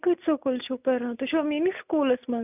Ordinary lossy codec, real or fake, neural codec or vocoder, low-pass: none; real; none; 3.6 kHz